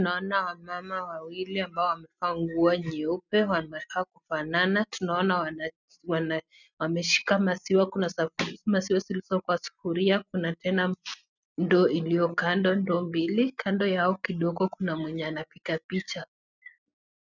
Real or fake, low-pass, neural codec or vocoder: real; 7.2 kHz; none